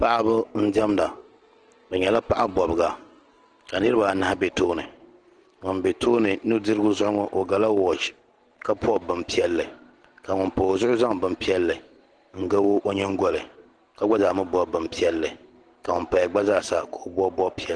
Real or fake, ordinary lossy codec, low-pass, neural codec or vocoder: real; Opus, 16 kbps; 9.9 kHz; none